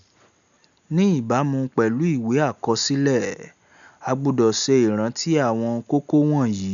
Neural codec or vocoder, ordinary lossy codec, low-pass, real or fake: none; none; 7.2 kHz; real